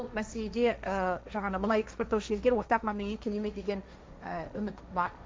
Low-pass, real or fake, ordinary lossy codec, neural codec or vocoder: none; fake; none; codec, 16 kHz, 1.1 kbps, Voila-Tokenizer